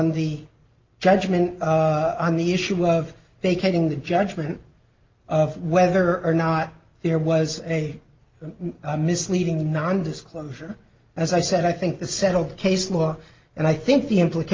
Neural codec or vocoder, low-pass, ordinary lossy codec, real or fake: none; 7.2 kHz; Opus, 24 kbps; real